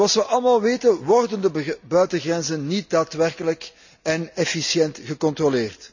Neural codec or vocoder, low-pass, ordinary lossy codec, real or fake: none; 7.2 kHz; none; real